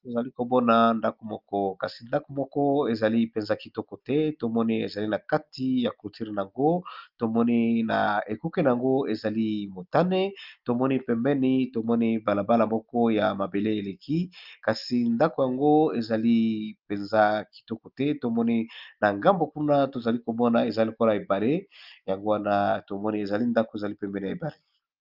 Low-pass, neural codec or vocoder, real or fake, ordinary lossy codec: 5.4 kHz; none; real; Opus, 24 kbps